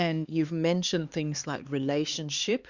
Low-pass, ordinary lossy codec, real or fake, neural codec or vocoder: 7.2 kHz; Opus, 64 kbps; fake; codec, 16 kHz, 2 kbps, X-Codec, HuBERT features, trained on LibriSpeech